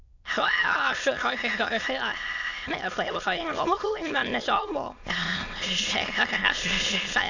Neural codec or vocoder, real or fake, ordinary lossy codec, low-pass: autoencoder, 22.05 kHz, a latent of 192 numbers a frame, VITS, trained on many speakers; fake; AAC, 48 kbps; 7.2 kHz